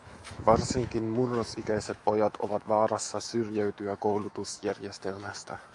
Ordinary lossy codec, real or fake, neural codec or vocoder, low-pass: AAC, 64 kbps; fake; autoencoder, 48 kHz, 128 numbers a frame, DAC-VAE, trained on Japanese speech; 10.8 kHz